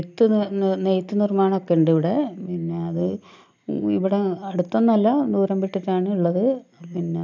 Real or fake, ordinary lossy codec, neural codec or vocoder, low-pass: real; none; none; 7.2 kHz